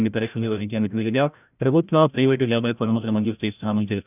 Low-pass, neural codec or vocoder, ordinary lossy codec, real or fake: 3.6 kHz; codec, 16 kHz, 0.5 kbps, FreqCodec, larger model; none; fake